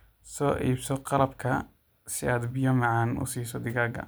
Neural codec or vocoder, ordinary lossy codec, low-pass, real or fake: none; none; none; real